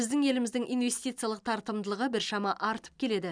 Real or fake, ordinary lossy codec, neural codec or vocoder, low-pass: real; none; none; 9.9 kHz